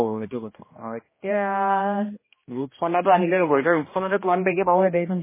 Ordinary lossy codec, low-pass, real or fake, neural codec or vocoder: MP3, 16 kbps; 3.6 kHz; fake; codec, 16 kHz, 1 kbps, X-Codec, HuBERT features, trained on general audio